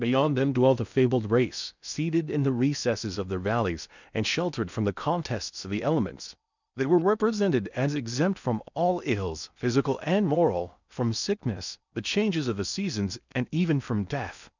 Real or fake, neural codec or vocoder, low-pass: fake; codec, 16 kHz in and 24 kHz out, 0.6 kbps, FocalCodec, streaming, 2048 codes; 7.2 kHz